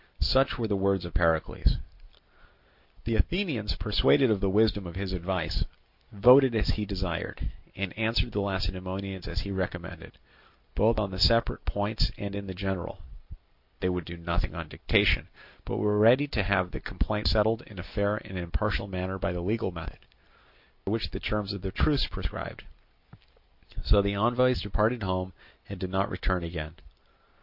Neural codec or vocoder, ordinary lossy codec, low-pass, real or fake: none; Opus, 64 kbps; 5.4 kHz; real